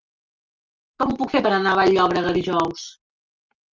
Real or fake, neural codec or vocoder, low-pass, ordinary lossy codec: real; none; 7.2 kHz; Opus, 32 kbps